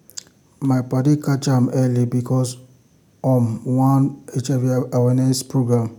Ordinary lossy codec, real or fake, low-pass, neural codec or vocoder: none; real; 19.8 kHz; none